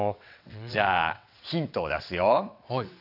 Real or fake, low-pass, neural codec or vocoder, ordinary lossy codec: fake; 5.4 kHz; vocoder, 22.05 kHz, 80 mel bands, WaveNeXt; AAC, 48 kbps